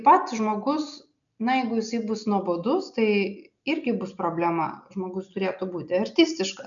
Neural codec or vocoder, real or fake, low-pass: none; real; 7.2 kHz